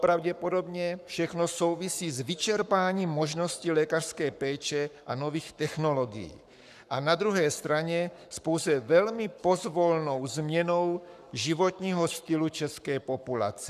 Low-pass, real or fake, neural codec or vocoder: 14.4 kHz; fake; codec, 44.1 kHz, 7.8 kbps, Pupu-Codec